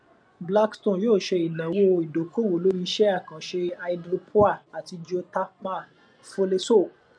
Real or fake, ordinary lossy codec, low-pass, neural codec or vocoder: fake; none; 9.9 kHz; vocoder, 24 kHz, 100 mel bands, Vocos